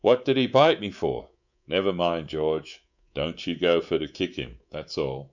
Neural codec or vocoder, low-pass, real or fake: codec, 24 kHz, 3.1 kbps, DualCodec; 7.2 kHz; fake